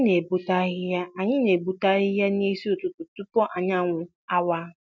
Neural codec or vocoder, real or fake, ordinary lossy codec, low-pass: none; real; none; none